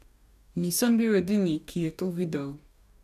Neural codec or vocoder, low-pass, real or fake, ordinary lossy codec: codec, 44.1 kHz, 2.6 kbps, DAC; 14.4 kHz; fake; none